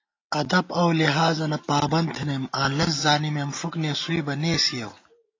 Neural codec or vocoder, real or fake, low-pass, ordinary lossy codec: none; real; 7.2 kHz; AAC, 32 kbps